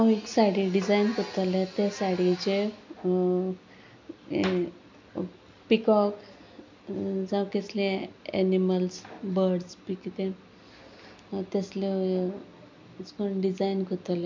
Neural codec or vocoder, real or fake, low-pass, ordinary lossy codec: none; real; 7.2 kHz; MP3, 64 kbps